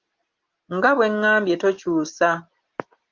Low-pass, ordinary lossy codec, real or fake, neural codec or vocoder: 7.2 kHz; Opus, 32 kbps; real; none